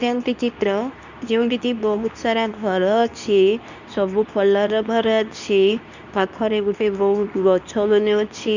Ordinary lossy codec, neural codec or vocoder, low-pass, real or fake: none; codec, 24 kHz, 0.9 kbps, WavTokenizer, medium speech release version 2; 7.2 kHz; fake